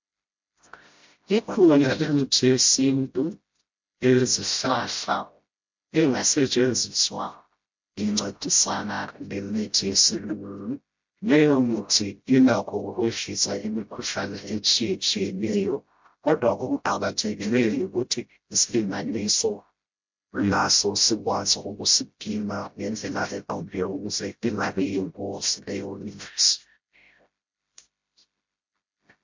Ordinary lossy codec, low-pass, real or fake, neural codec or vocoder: MP3, 48 kbps; 7.2 kHz; fake; codec, 16 kHz, 0.5 kbps, FreqCodec, smaller model